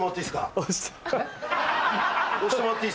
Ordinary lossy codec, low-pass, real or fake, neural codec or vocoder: none; none; real; none